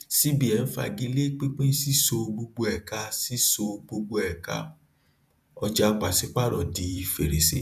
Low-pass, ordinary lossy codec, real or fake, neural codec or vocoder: 14.4 kHz; none; fake; vocoder, 48 kHz, 128 mel bands, Vocos